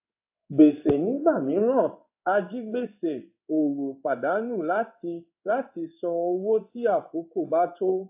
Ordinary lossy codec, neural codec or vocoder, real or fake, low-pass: AAC, 32 kbps; codec, 16 kHz in and 24 kHz out, 1 kbps, XY-Tokenizer; fake; 3.6 kHz